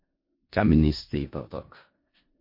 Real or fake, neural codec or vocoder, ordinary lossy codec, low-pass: fake; codec, 16 kHz in and 24 kHz out, 0.4 kbps, LongCat-Audio-Codec, four codebook decoder; MP3, 32 kbps; 5.4 kHz